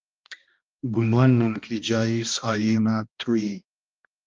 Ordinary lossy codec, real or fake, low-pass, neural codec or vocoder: Opus, 32 kbps; fake; 7.2 kHz; codec, 16 kHz, 1 kbps, X-Codec, HuBERT features, trained on balanced general audio